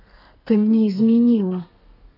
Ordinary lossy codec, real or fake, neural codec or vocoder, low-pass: none; fake; codec, 32 kHz, 1.9 kbps, SNAC; 5.4 kHz